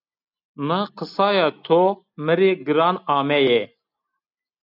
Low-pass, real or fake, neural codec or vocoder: 5.4 kHz; real; none